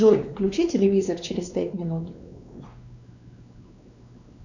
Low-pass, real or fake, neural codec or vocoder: 7.2 kHz; fake; codec, 16 kHz, 2 kbps, X-Codec, WavLM features, trained on Multilingual LibriSpeech